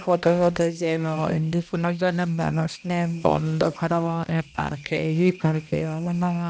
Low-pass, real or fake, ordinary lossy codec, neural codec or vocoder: none; fake; none; codec, 16 kHz, 1 kbps, X-Codec, HuBERT features, trained on balanced general audio